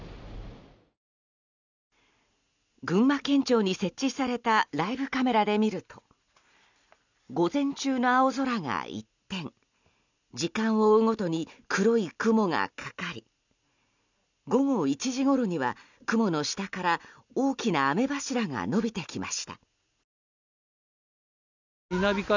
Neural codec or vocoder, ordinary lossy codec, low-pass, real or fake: none; none; 7.2 kHz; real